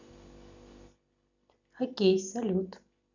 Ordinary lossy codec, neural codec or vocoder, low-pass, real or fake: none; none; 7.2 kHz; real